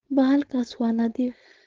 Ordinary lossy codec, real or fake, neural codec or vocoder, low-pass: Opus, 16 kbps; fake; codec, 16 kHz, 4.8 kbps, FACodec; 7.2 kHz